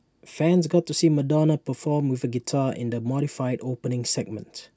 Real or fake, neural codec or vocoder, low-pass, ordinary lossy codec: real; none; none; none